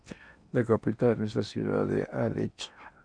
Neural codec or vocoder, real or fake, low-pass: codec, 16 kHz in and 24 kHz out, 0.8 kbps, FocalCodec, streaming, 65536 codes; fake; 9.9 kHz